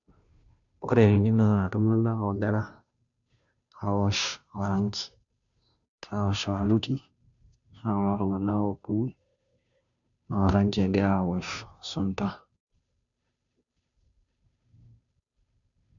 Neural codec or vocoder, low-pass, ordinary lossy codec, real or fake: codec, 16 kHz, 0.5 kbps, FunCodec, trained on Chinese and English, 25 frames a second; 7.2 kHz; none; fake